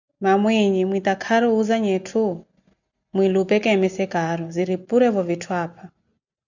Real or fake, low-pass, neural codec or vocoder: real; 7.2 kHz; none